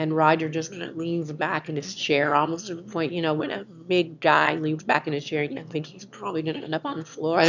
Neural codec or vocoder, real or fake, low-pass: autoencoder, 22.05 kHz, a latent of 192 numbers a frame, VITS, trained on one speaker; fake; 7.2 kHz